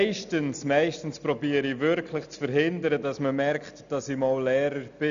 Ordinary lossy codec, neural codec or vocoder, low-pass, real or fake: none; none; 7.2 kHz; real